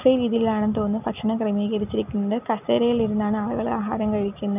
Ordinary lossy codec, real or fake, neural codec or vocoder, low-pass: none; real; none; 3.6 kHz